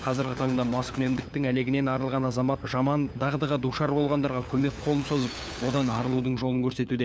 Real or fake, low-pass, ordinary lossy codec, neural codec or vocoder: fake; none; none; codec, 16 kHz, 4 kbps, FunCodec, trained on LibriTTS, 50 frames a second